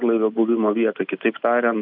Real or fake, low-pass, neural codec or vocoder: real; 5.4 kHz; none